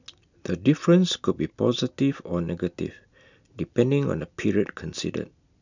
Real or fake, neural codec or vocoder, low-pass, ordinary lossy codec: real; none; 7.2 kHz; none